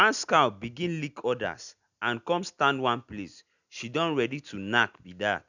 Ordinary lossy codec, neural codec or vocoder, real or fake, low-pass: none; none; real; 7.2 kHz